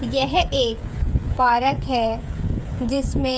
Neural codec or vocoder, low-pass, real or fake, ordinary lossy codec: codec, 16 kHz, 8 kbps, FreqCodec, smaller model; none; fake; none